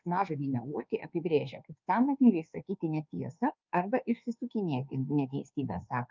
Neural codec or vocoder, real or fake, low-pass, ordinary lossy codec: codec, 24 kHz, 1.2 kbps, DualCodec; fake; 7.2 kHz; Opus, 24 kbps